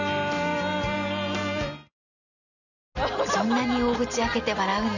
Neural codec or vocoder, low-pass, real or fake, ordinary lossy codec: none; 7.2 kHz; real; none